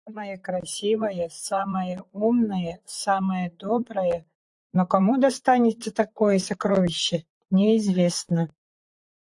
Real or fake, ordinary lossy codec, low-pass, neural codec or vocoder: fake; AAC, 64 kbps; 10.8 kHz; vocoder, 44.1 kHz, 128 mel bands, Pupu-Vocoder